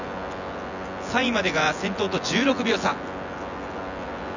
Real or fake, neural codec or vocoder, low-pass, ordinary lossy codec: fake; vocoder, 24 kHz, 100 mel bands, Vocos; 7.2 kHz; none